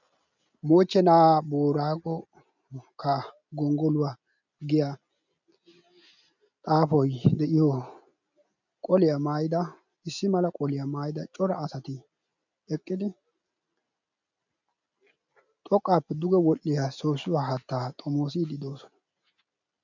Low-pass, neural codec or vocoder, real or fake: 7.2 kHz; none; real